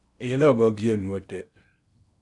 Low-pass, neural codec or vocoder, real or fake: 10.8 kHz; codec, 16 kHz in and 24 kHz out, 0.8 kbps, FocalCodec, streaming, 65536 codes; fake